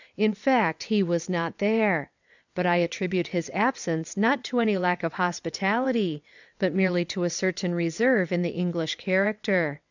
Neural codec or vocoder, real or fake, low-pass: vocoder, 22.05 kHz, 80 mel bands, WaveNeXt; fake; 7.2 kHz